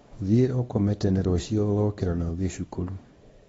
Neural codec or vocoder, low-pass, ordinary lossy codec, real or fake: codec, 24 kHz, 0.9 kbps, WavTokenizer, small release; 10.8 kHz; AAC, 24 kbps; fake